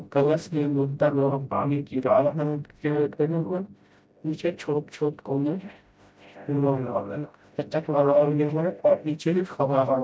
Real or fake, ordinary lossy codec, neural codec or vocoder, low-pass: fake; none; codec, 16 kHz, 0.5 kbps, FreqCodec, smaller model; none